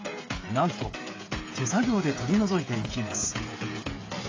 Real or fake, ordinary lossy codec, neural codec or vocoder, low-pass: fake; AAC, 32 kbps; codec, 16 kHz, 16 kbps, FreqCodec, smaller model; 7.2 kHz